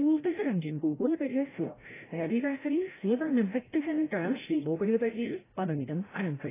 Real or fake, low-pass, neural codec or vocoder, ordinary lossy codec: fake; 3.6 kHz; codec, 16 kHz, 0.5 kbps, FreqCodec, larger model; AAC, 16 kbps